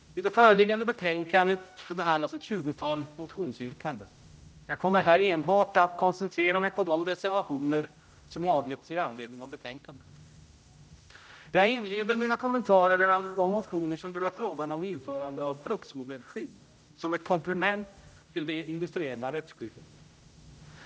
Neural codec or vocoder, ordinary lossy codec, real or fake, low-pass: codec, 16 kHz, 0.5 kbps, X-Codec, HuBERT features, trained on general audio; none; fake; none